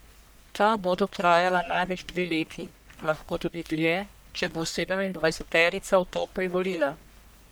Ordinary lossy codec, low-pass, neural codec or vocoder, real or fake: none; none; codec, 44.1 kHz, 1.7 kbps, Pupu-Codec; fake